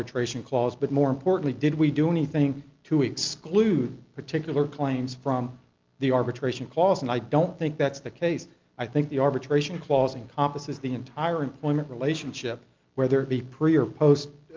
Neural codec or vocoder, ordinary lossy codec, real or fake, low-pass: none; Opus, 32 kbps; real; 7.2 kHz